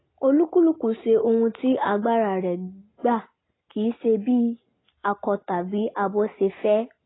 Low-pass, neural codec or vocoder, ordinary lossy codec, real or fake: 7.2 kHz; none; AAC, 16 kbps; real